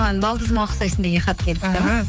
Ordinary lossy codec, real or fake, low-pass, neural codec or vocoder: none; fake; none; codec, 16 kHz, 4 kbps, X-Codec, HuBERT features, trained on general audio